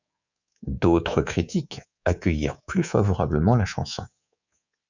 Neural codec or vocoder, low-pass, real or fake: codec, 24 kHz, 3.1 kbps, DualCodec; 7.2 kHz; fake